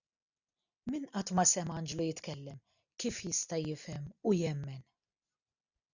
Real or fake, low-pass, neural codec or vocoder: real; 7.2 kHz; none